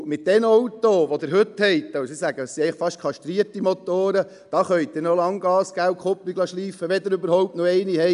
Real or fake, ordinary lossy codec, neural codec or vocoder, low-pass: real; none; none; 10.8 kHz